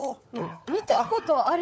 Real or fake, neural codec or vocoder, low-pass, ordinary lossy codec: fake; codec, 16 kHz, 16 kbps, FunCodec, trained on LibriTTS, 50 frames a second; none; none